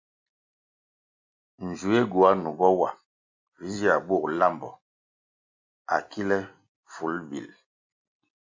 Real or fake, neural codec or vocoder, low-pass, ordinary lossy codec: real; none; 7.2 kHz; MP3, 48 kbps